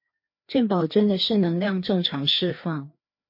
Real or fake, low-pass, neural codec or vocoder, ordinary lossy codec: fake; 5.4 kHz; codec, 16 kHz, 2 kbps, FreqCodec, larger model; MP3, 32 kbps